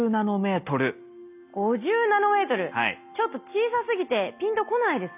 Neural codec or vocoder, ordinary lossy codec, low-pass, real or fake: none; none; 3.6 kHz; real